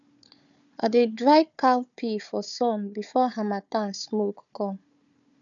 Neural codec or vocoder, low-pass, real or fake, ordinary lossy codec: codec, 16 kHz, 4 kbps, FunCodec, trained on Chinese and English, 50 frames a second; 7.2 kHz; fake; none